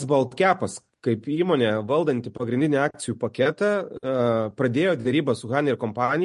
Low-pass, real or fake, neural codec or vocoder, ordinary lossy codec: 10.8 kHz; real; none; MP3, 48 kbps